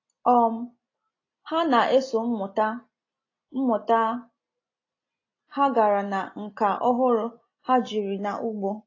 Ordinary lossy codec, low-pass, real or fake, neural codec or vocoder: AAC, 32 kbps; 7.2 kHz; real; none